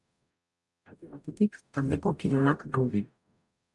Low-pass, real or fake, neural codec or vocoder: 10.8 kHz; fake; codec, 44.1 kHz, 0.9 kbps, DAC